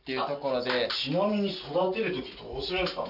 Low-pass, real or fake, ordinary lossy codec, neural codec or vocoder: 5.4 kHz; real; none; none